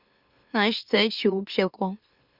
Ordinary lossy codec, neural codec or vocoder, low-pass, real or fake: Opus, 64 kbps; autoencoder, 44.1 kHz, a latent of 192 numbers a frame, MeloTTS; 5.4 kHz; fake